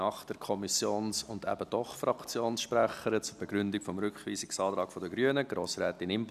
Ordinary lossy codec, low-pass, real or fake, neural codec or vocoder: none; 14.4 kHz; real; none